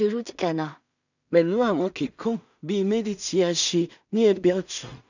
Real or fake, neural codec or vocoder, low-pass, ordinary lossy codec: fake; codec, 16 kHz in and 24 kHz out, 0.4 kbps, LongCat-Audio-Codec, two codebook decoder; 7.2 kHz; none